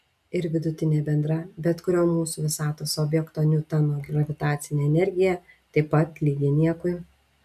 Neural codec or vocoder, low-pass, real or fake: none; 14.4 kHz; real